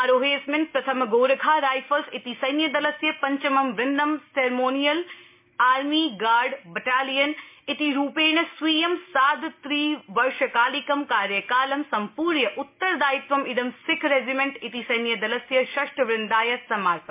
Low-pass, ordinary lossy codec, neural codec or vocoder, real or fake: 3.6 kHz; MP3, 32 kbps; none; real